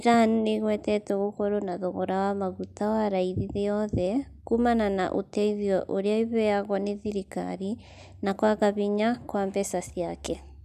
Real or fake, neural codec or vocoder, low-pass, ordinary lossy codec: real; none; 14.4 kHz; none